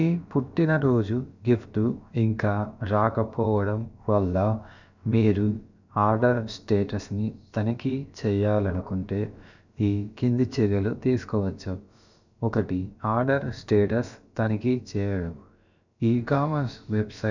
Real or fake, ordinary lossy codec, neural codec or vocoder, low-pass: fake; none; codec, 16 kHz, about 1 kbps, DyCAST, with the encoder's durations; 7.2 kHz